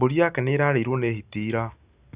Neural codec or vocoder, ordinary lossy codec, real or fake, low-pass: none; Opus, 64 kbps; real; 3.6 kHz